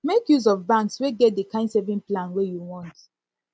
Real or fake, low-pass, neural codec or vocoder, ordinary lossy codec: real; none; none; none